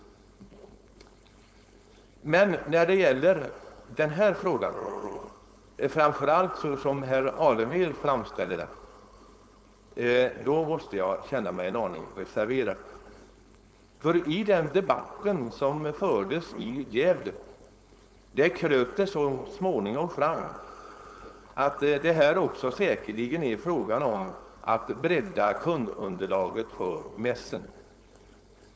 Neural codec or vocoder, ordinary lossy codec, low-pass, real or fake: codec, 16 kHz, 4.8 kbps, FACodec; none; none; fake